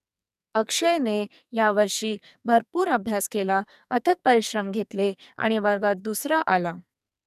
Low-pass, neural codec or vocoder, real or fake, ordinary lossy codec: 14.4 kHz; codec, 44.1 kHz, 2.6 kbps, SNAC; fake; none